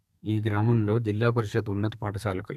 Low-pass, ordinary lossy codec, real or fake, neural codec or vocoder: 14.4 kHz; none; fake; codec, 32 kHz, 1.9 kbps, SNAC